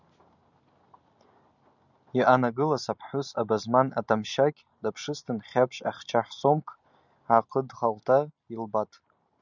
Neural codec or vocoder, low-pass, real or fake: none; 7.2 kHz; real